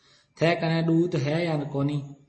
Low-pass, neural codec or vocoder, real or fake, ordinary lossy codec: 10.8 kHz; none; real; MP3, 32 kbps